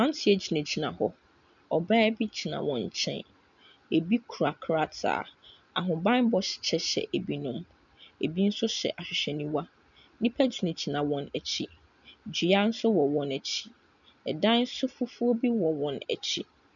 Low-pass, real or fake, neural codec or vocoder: 7.2 kHz; real; none